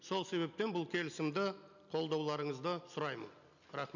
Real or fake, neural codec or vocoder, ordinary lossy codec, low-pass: real; none; none; 7.2 kHz